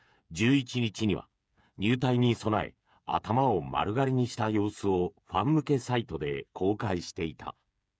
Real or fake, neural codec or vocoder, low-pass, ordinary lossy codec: fake; codec, 16 kHz, 8 kbps, FreqCodec, smaller model; none; none